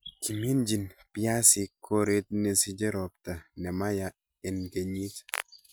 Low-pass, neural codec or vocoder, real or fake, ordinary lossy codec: none; none; real; none